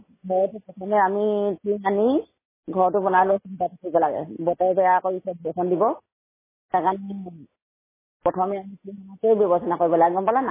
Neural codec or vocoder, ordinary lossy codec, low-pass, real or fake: none; MP3, 16 kbps; 3.6 kHz; real